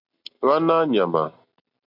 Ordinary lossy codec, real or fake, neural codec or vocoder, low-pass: MP3, 48 kbps; real; none; 5.4 kHz